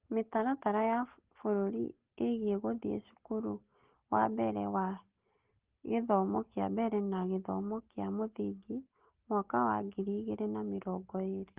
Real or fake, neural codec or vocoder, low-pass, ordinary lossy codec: real; none; 3.6 kHz; Opus, 16 kbps